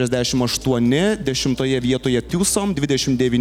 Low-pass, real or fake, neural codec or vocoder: 19.8 kHz; real; none